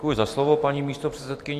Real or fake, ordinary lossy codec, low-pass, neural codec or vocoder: fake; AAC, 64 kbps; 14.4 kHz; vocoder, 44.1 kHz, 128 mel bands every 512 samples, BigVGAN v2